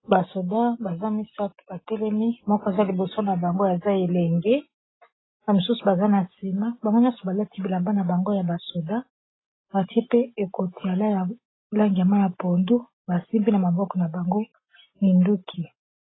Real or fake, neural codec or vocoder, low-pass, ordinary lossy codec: real; none; 7.2 kHz; AAC, 16 kbps